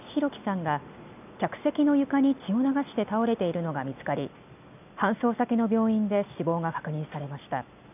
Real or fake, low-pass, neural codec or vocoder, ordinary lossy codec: real; 3.6 kHz; none; none